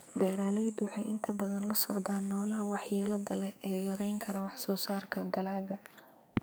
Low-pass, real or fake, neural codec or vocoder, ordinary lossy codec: none; fake; codec, 44.1 kHz, 2.6 kbps, SNAC; none